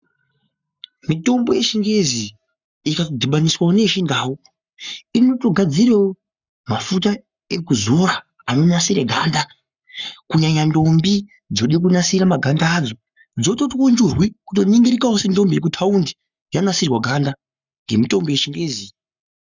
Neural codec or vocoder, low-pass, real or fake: codec, 44.1 kHz, 7.8 kbps, Pupu-Codec; 7.2 kHz; fake